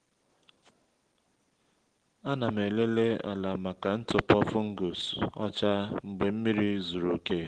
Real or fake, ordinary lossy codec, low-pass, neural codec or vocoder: real; Opus, 16 kbps; 9.9 kHz; none